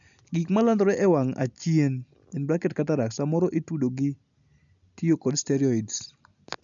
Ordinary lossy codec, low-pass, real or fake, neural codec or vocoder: none; 7.2 kHz; real; none